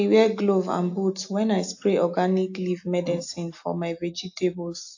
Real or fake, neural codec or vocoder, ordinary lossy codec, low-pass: real; none; AAC, 48 kbps; 7.2 kHz